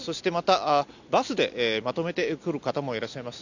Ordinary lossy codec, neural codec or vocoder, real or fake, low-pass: none; none; real; 7.2 kHz